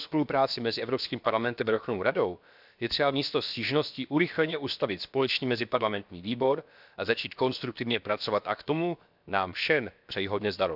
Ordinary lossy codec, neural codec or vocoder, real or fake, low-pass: none; codec, 16 kHz, about 1 kbps, DyCAST, with the encoder's durations; fake; 5.4 kHz